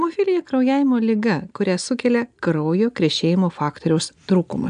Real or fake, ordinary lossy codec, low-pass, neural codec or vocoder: real; AAC, 96 kbps; 9.9 kHz; none